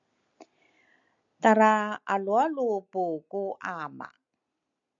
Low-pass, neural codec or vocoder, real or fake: 7.2 kHz; none; real